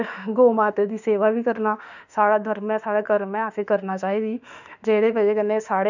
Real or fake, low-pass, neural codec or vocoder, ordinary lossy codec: fake; 7.2 kHz; autoencoder, 48 kHz, 32 numbers a frame, DAC-VAE, trained on Japanese speech; none